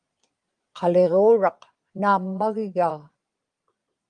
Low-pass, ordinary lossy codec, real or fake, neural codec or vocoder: 9.9 kHz; Opus, 32 kbps; fake; vocoder, 22.05 kHz, 80 mel bands, Vocos